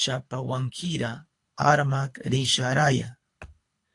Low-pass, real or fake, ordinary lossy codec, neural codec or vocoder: 10.8 kHz; fake; AAC, 48 kbps; codec, 24 kHz, 3 kbps, HILCodec